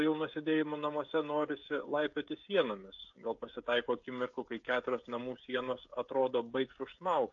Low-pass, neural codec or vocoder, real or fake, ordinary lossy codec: 7.2 kHz; codec, 16 kHz, 16 kbps, FreqCodec, smaller model; fake; AAC, 48 kbps